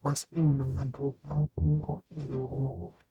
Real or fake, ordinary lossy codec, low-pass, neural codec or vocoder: fake; Opus, 64 kbps; 19.8 kHz; codec, 44.1 kHz, 0.9 kbps, DAC